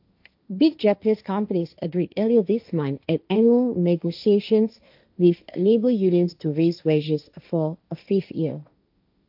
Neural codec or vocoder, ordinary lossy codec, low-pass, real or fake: codec, 16 kHz, 1.1 kbps, Voila-Tokenizer; AAC, 48 kbps; 5.4 kHz; fake